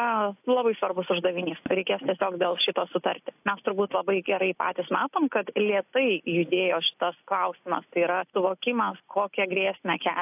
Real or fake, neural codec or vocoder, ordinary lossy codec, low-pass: real; none; AAC, 32 kbps; 3.6 kHz